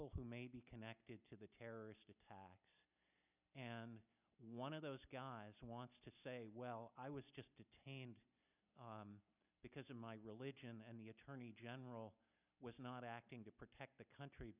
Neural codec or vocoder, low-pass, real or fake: none; 3.6 kHz; real